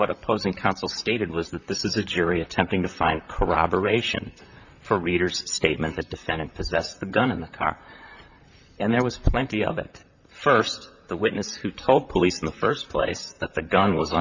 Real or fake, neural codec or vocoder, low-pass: fake; vocoder, 44.1 kHz, 128 mel bands, Pupu-Vocoder; 7.2 kHz